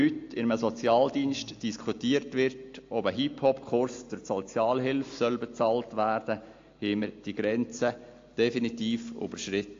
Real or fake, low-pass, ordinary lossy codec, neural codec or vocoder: real; 7.2 kHz; AAC, 48 kbps; none